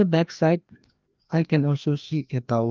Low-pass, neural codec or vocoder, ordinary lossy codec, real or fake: 7.2 kHz; codec, 16 kHz, 1 kbps, FreqCodec, larger model; Opus, 24 kbps; fake